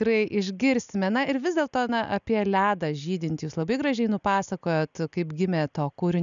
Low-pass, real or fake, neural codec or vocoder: 7.2 kHz; real; none